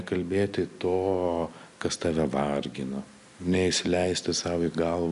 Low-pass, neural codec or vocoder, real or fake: 10.8 kHz; none; real